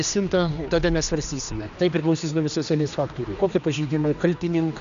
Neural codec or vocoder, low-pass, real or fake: codec, 16 kHz, 2 kbps, X-Codec, HuBERT features, trained on general audio; 7.2 kHz; fake